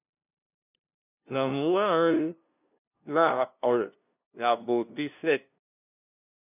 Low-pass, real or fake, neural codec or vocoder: 3.6 kHz; fake; codec, 16 kHz, 0.5 kbps, FunCodec, trained on LibriTTS, 25 frames a second